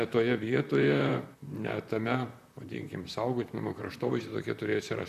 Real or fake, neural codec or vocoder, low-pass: fake; vocoder, 44.1 kHz, 128 mel bands, Pupu-Vocoder; 14.4 kHz